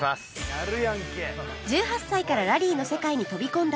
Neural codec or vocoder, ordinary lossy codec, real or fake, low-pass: none; none; real; none